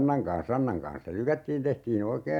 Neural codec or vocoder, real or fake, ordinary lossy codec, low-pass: none; real; none; 19.8 kHz